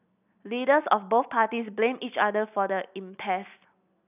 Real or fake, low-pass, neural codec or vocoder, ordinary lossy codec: real; 3.6 kHz; none; none